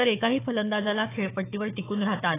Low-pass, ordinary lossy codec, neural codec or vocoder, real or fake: 3.6 kHz; AAC, 16 kbps; codec, 16 kHz, 4 kbps, FunCodec, trained on Chinese and English, 50 frames a second; fake